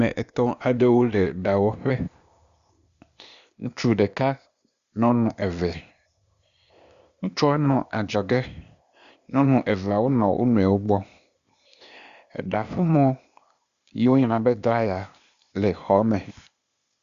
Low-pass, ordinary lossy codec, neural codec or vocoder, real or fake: 7.2 kHz; Opus, 64 kbps; codec, 16 kHz, 0.8 kbps, ZipCodec; fake